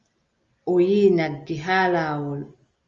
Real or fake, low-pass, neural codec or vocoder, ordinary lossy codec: real; 7.2 kHz; none; Opus, 32 kbps